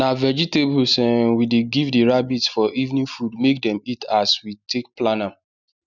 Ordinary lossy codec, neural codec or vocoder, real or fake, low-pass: none; none; real; 7.2 kHz